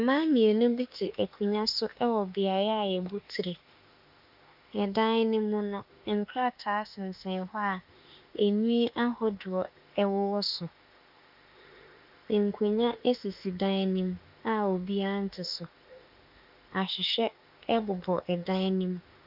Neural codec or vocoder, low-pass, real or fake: autoencoder, 48 kHz, 32 numbers a frame, DAC-VAE, trained on Japanese speech; 5.4 kHz; fake